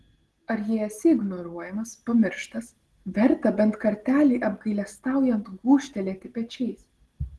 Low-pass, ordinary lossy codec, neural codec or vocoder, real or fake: 10.8 kHz; Opus, 16 kbps; none; real